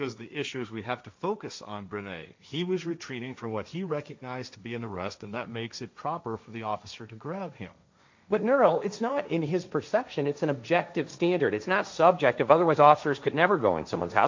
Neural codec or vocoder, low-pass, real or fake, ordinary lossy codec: codec, 16 kHz, 1.1 kbps, Voila-Tokenizer; 7.2 kHz; fake; MP3, 64 kbps